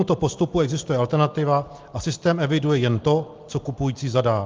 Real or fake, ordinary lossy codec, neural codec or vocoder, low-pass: real; Opus, 32 kbps; none; 7.2 kHz